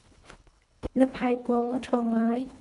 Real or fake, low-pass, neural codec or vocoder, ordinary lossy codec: fake; 10.8 kHz; codec, 24 kHz, 1.5 kbps, HILCodec; AAC, 96 kbps